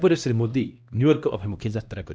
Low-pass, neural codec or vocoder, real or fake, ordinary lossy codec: none; codec, 16 kHz, 1 kbps, X-Codec, HuBERT features, trained on LibriSpeech; fake; none